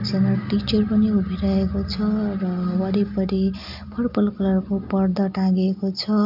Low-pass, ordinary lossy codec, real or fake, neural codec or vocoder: 5.4 kHz; none; real; none